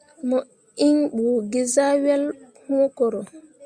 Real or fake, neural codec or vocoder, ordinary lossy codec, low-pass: real; none; Opus, 64 kbps; 9.9 kHz